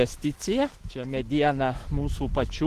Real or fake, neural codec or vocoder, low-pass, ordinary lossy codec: fake; autoencoder, 48 kHz, 128 numbers a frame, DAC-VAE, trained on Japanese speech; 14.4 kHz; Opus, 16 kbps